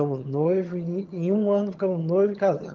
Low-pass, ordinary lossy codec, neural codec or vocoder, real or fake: 7.2 kHz; Opus, 32 kbps; vocoder, 22.05 kHz, 80 mel bands, HiFi-GAN; fake